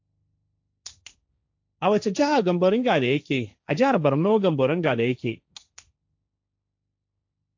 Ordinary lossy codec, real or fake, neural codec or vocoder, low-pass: AAC, 48 kbps; fake; codec, 16 kHz, 1.1 kbps, Voila-Tokenizer; 7.2 kHz